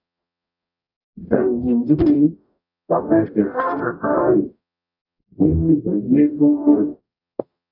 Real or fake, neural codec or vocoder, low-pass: fake; codec, 44.1 kHz, 0.9 kbps, DAC; 5.4 kHz